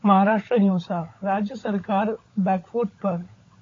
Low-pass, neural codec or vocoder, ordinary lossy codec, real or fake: 7.2 kHz; codec, 16 kHz, 16 kbps, FunCodec, trained on LibriTTS, 50 frames a second; AAC, 32 kbps; fake